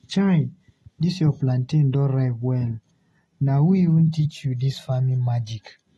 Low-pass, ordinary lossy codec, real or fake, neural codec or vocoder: 19.8 kHz; AAC, 32 kbps; real; none